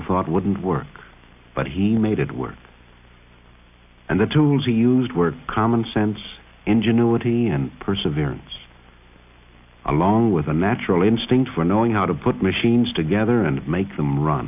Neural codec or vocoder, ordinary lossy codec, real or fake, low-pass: none; AAC, 32 kbps; real; 3.6 kHz